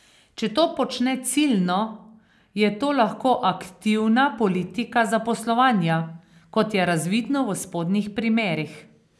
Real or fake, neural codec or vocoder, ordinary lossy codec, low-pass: real; none; none; none